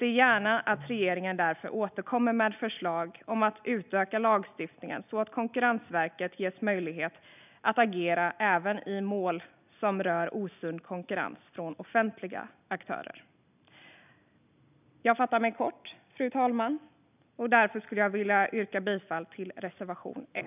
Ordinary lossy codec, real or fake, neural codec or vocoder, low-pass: none; real; none; 3.6 kHz